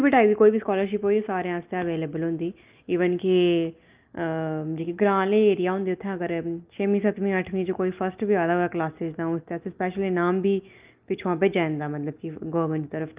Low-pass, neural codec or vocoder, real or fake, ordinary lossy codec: 3.6 kHz; none; real; Opus, 32 kbps